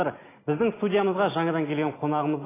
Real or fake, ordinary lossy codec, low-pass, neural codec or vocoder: real; AAC, 16 kbps; 3.6 kHz; none